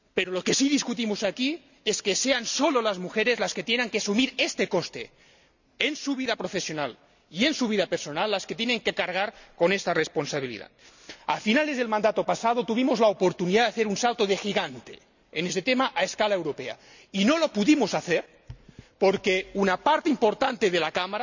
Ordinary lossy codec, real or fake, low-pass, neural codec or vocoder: none; real; 7.2 kHz; none